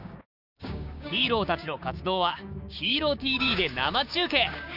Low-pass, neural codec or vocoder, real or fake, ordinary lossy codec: 5.4 kHz; codec, 16 kHz, 6 kbps, DAC; fake; none